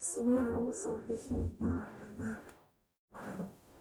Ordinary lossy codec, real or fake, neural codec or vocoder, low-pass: none; fake; codec, 44.1 kHz, 0.9 kbps, DAC; none